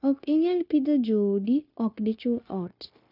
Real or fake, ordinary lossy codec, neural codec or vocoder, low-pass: fake; none; codec, 16 kHz, 0.9 kbps, LongCat-Audio-Codec; 5.4 kHz